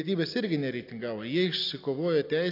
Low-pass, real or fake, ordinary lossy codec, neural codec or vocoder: 5.4 kHz; real; AAC, 32 kbps; none